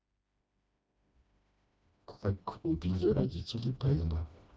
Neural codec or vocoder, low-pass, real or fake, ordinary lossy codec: codec, 16 kHz, 1 kbps, FreqCodec, smaller model; none; fake; none